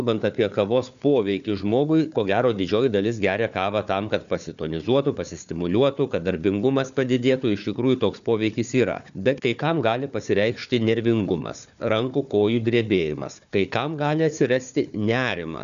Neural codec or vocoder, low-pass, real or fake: codec, 16 kHz, 4 kbps, FunCodec, trained on Chinese and English, 50 frames a second; 7.2 kHz; fake